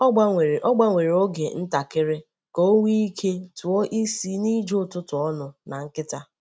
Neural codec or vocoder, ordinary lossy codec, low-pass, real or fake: none; none; none; real